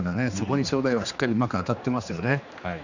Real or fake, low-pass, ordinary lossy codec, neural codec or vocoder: fake; 7.2 kHz; none; codec, 16 kHz, 2 kbps, X-Codec, HuBERT features, trained on general audio